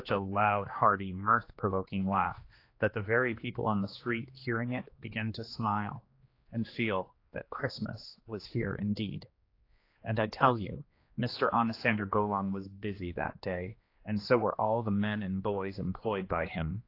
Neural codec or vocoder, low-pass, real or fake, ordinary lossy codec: codec, 16 kHz, 2 kbps, X-Codec, HuBERT features, trained on general audio; 5.4 kHz; fake; AAC, 32 kbps